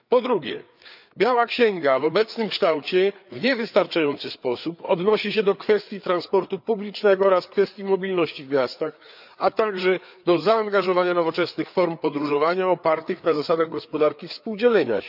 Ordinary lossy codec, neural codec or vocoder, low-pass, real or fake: none; codec, 16 kHz, 4 kbps, FreqCodec, larger model; 5.4 kHz; fake